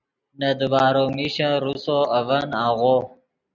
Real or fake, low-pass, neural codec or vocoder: real; 7.2 kHz; none